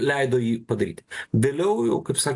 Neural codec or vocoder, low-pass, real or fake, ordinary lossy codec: none; 10.8 kHz; real; AAC, 48 kbps